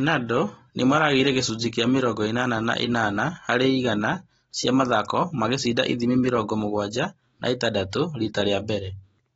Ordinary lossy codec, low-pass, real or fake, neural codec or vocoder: AAC, 24 kbps; 19.8 kHz; real; none